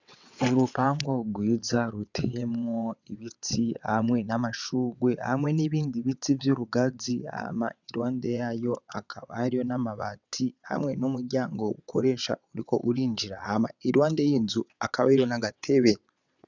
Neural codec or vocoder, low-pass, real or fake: vocoder, 22.05 kHz, 80 mel bands, WaveNeXt; 7.2 kHz; fake